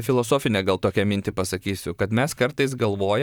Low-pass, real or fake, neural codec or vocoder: 19.8 kHz; fake; vocoder, 44.1 kHz, 128 mel bands, Pupu-Vocoder